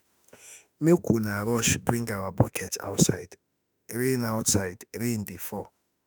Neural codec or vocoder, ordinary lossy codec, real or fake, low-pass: autoencoder, 48 kHz, 32 numbers a frame, DAC-VAE, trained on Japanese speech; none; fake; none